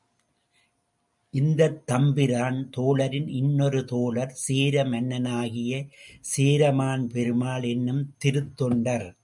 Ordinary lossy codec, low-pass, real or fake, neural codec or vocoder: MP3, 96 kbps; 10.8 kHz; real; none